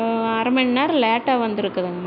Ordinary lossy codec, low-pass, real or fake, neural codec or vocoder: none; 5.4 kHz; real; none